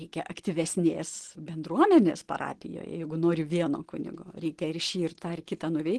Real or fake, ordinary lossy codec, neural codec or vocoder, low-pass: real; Opus, 16 kbps; none; 10.8 kHz